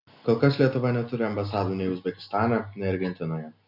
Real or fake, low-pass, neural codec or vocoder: real; 5.4 kHz; none